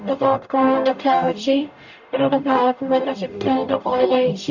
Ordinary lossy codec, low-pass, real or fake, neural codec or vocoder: none; 7.2 kHz; fake; codec, 44.1 kHz, 0.9 kbps, DAC